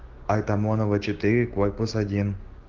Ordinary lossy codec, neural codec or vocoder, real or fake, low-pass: Opus, 16 kbps; codec, 16 kHz, 6 kbps, DAC; fake; 7.2 kHz